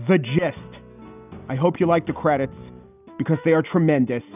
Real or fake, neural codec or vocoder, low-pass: real; none; 3.6 kHz